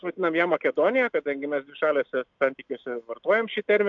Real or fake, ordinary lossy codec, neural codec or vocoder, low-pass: real; AAC, 64 kbps; none; 7.2 kHz